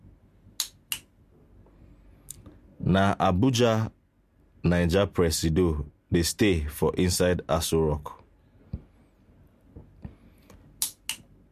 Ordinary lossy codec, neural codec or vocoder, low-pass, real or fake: MP3, 64 kbps; none; 14.4 kHz; real